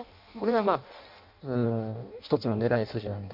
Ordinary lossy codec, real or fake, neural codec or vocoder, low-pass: MP3, 48 kbps; fake; codec, 16 kHz in and 24 kHz out, 0.6 kbps, FireRedTTS-2 codec; 5.4 kHz